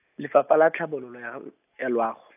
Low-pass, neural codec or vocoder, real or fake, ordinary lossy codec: 3.6 kHz; none; real; AAC, 32 kbps